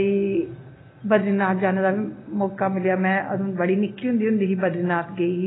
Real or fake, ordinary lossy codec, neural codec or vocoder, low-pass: real; AAC, 16 kbps; none; 7.2 kHz